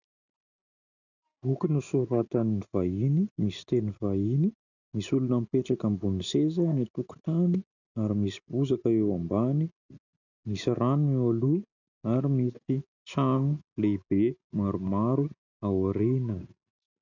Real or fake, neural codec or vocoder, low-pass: real; none; 7.2 kHz